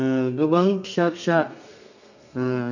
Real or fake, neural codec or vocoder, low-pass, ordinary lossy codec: fake; codec, 32 kHz, 1.9 kbps, SNAC; 7.2 kHz; none